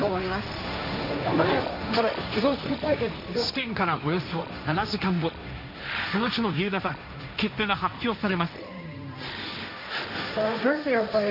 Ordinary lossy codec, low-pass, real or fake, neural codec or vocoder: none; 5.4 kHz; fake; codec, 16 kHz, 1.1 kbps, Voila-Tokenizer